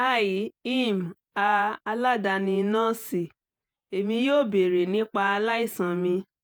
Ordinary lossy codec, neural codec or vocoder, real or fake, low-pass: none; vocoder, 48 kHz, 128 mel bands, Vocos; fake; none